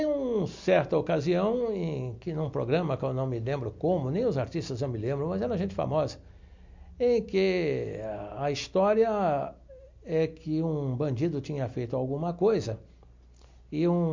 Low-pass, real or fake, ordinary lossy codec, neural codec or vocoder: 7.2 kHz; real; MP3, 64 kbps; none